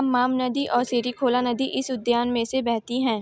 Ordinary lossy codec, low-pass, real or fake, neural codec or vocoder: none; none; real; none